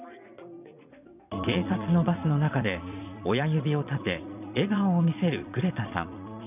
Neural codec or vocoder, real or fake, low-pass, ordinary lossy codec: vocoder, 22.05 kHz, 80 mel bands, Vocos; fake; 3.6 kHz; none